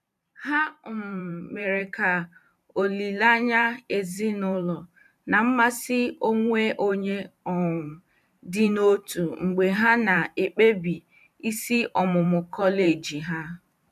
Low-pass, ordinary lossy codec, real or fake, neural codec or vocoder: 14.4 kHz; none; fake; vocoder, 44.1 kHz, 128 mel bands every 512 samples, BigVGAN v2